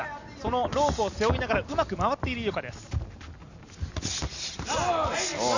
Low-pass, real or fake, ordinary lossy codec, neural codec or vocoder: 7.2 kHz; real; none; none